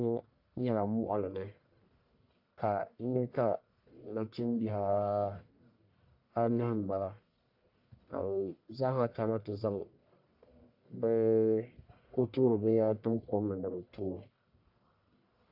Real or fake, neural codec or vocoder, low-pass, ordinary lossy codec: fake; codec, 44.1 kHz, 1.7 kbps, Pupu-Codec; 5.4 kHz; AAC, 48 kbps